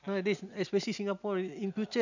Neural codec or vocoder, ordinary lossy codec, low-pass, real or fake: none; none; 7.2 kHz; real